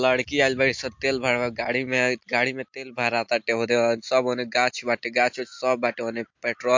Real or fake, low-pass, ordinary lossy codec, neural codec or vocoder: real; 7.2 kHz; MP3, 48 kbps; none